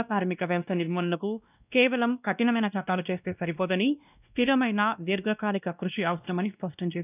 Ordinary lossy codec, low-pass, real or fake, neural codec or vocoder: none; 3.6 kHz; fake; codec, 16 kHz, 1 kbps, X-Codec, WavLM features, trained on Multilingual LibriSpeech